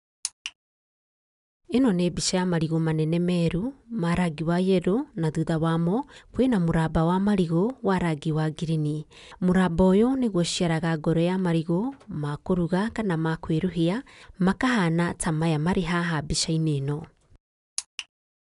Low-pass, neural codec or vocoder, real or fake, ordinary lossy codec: 10.8 kHz; none; real; none